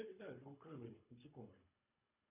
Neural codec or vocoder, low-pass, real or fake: codec, 24 kHz, 3 kbps, HILCodec; 3.6 kHz; fake